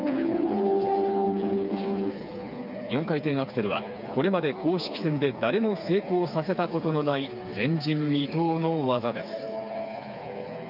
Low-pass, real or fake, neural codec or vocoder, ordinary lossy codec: 5.4 kHz; fake; codec, 16 kHz, 4 kbps, FreqCodec, smaller model; none